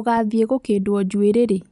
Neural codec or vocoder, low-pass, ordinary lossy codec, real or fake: none; 10.8 kHz; none; real